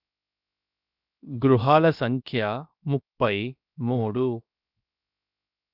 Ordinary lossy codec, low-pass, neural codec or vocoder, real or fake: none; 5.4 kHz; codec, 16 kHz, 0.7 kbps, FocalCodec; fake